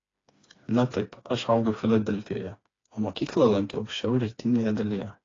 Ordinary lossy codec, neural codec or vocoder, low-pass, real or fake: AAC, 32 kbps; codec, 16 kHz, 2 kbps, FreqCodec, smaller model; 7.2 kHz; fake